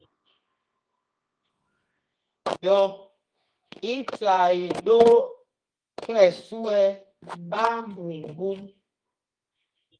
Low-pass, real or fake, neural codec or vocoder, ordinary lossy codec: 9.9 kHz; fake; codec, 24 kHz, 0.9 kbps, WavTokenizer, medium music audio release; Opus, 24 kbps